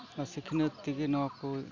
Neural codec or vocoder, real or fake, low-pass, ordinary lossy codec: none; real; 7.2 kHz; none